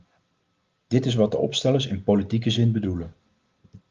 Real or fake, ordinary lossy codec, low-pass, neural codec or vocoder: real; Opus, 32 kbps; 7.2 kHz; none